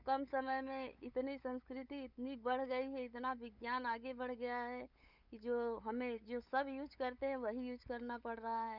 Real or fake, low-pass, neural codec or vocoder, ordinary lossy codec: fake; 5.4 kHz; codec, 16 kHz, 8 kbps, FunCodec, trained on LibriTTS, 25 frames a second; none